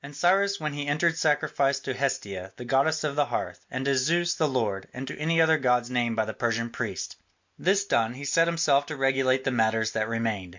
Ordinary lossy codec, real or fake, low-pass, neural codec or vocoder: MP3, 64 kbps; real; 7.2 kHz; none